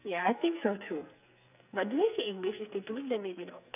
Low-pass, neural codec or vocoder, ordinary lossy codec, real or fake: 3.6 kHz; codec, 44.1 kHz, 2.6 kbps, SNAC; none; fake